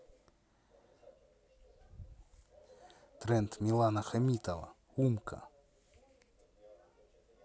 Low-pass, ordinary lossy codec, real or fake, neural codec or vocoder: none; none; real; none